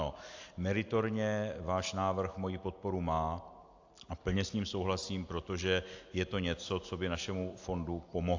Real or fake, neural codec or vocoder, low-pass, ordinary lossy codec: real; none; 7.2 kHz; AAC, 48 kbps